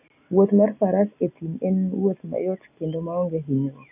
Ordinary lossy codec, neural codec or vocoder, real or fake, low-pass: none; none; real; 3.6 kHz